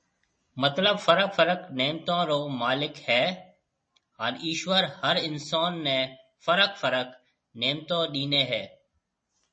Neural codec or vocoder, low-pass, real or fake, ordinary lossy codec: none; 9.9 kHz; real; MP3, 32 kbps